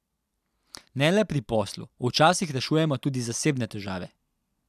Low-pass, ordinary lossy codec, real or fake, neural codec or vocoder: 14.4 kHz; none; real; none